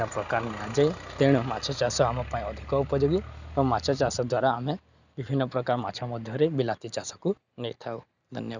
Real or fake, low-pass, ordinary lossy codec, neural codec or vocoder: real; 7.2 kHz; none; none